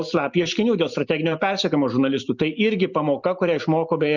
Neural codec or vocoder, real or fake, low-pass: none; real; 7.2 kHz